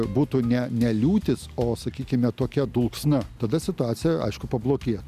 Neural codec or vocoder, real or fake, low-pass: none; real; 14.4 kHz